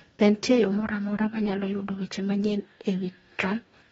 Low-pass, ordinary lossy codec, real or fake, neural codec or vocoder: 19.8 kHz; AAC, 24 kbps; fake; codec, 44.1 kHz, 2.6 kbps, DAC